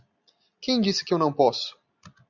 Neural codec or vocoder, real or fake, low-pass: none; real; 7.2 kHz